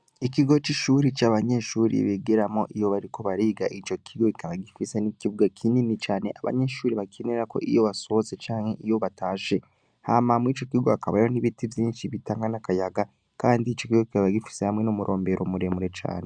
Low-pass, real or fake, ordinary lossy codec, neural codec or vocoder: 9.9 kHz; real; Opus, 64 kbps; none